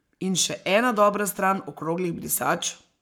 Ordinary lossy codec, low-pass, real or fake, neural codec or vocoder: none; none; fake; codec, 44.1 kHz, 7.8 kbps, Pupu-Codec